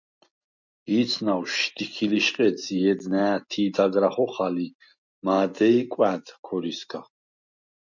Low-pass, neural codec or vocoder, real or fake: 7.2 kHz; none; real